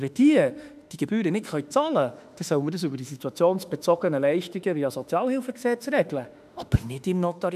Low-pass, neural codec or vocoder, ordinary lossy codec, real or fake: 14.4 kHz; autoencoder, 48 kHz, 32 numbers a frame, DAC-VAE, trained on Japanese speech; none; fake